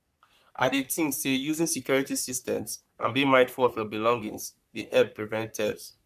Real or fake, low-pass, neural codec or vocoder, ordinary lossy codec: fake; 14.4 kHz; codec, 44.1 kHz, 3.4 kbps, Pupu-Codec; none